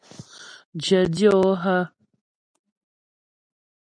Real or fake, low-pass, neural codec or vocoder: real; 9.9 kHz; none